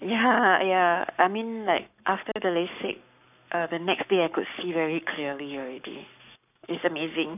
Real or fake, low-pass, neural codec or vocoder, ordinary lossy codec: fake; 3.6 kHz; codec, 44.1 kHz, 7.8 kbps, Pupu-Codec; none